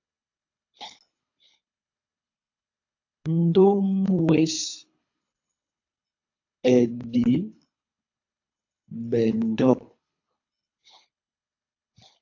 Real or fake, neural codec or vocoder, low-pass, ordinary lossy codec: fake; codec, 24 kHz, 3 kbps, HILCodec; 7.2 kHz; AAC, 48 kbps